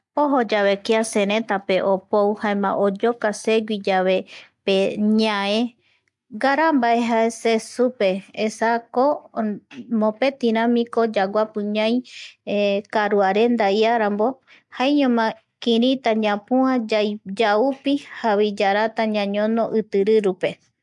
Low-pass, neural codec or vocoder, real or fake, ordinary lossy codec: 10.8 kHz; none; real; MP3, 64 kbps